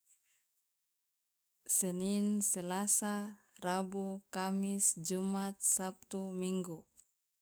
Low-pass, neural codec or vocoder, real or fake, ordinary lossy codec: none; codec, 44.1 kHz, 7.8 kbps, DAC; fake; none